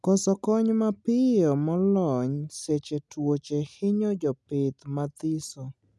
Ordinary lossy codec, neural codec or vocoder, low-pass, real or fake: none; none; none; real